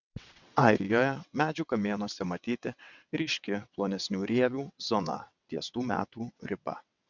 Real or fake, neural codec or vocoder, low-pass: fake; vocoder, 22.05 kHz, 80 mel bands, WaveNeXt; 7.2 kHz